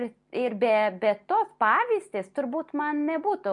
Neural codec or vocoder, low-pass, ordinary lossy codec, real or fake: none; 10.8 kHz; MP3, 64 kbps; real